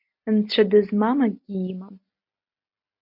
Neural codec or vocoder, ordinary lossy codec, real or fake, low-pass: none; MP3, 32 kbps; real; 5.4 kHz